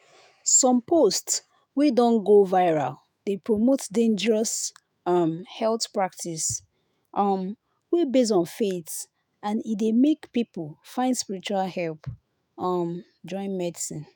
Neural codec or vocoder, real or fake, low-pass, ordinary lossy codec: autoencoder, 48 kHz, 128 numbers a frame, DAC-VAE, trained on Japanese speech; fake; none; none